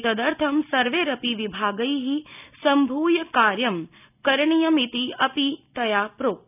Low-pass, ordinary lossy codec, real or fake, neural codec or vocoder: 3.6 kHz; none; real; none